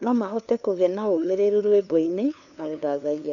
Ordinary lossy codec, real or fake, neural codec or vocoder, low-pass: none; fake; codec, 16 kHz, 2 kbps, FunCodec, trained on LibriTTS, 25 frames a second; 7.2 kHz